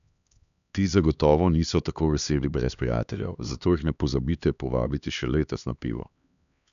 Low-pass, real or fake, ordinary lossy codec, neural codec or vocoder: 7.2 kHz; fake; none; codec, 16 kHz, 2 kbps, X-Codec, HuBERT features, trained on LibriSpeech